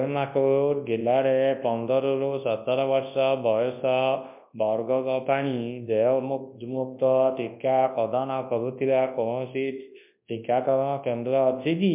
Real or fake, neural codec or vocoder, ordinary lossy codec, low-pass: fake; codec, 24 kHz, 0.9 kbps, WavTokenizer, large speech release; MP3, 32 kbps; 3.6 kHz